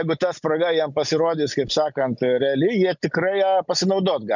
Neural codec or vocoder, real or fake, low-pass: none; real; 7.2 kHz